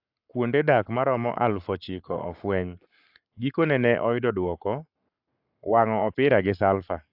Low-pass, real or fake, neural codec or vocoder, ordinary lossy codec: 5.4 kHz; fake; codec, 44.1 kHz, 7.8 kbps, Pupu-Codec; AAC, 48 kbps